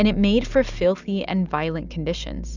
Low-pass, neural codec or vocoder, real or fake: 7.2 kHz; none; real